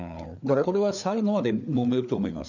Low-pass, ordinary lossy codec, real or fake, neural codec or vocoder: 7.2 kHz; none; fake; codec, 16 kHz, 4 kbps, FreqCodec, larger model